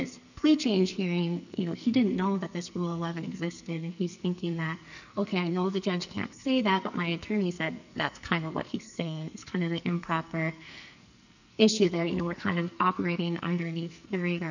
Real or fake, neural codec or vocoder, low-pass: fake; codec, 44.1 kHz, 2.6 kbps, SNAC; 7.2 kHz